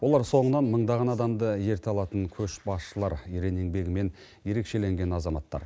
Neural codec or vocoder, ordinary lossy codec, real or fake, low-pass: none; none; real; none